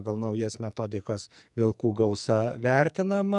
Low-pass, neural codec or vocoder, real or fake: 10.8 kHz; codec, 44.1 kHz, 2.6 kbps, SNAC; fake